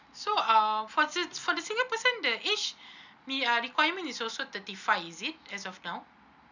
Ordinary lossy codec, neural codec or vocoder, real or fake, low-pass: none; none; real; 7.2 kHz